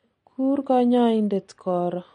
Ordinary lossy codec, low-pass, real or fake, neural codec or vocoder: MP3, 48 kbps; 9.9 kHz; real; none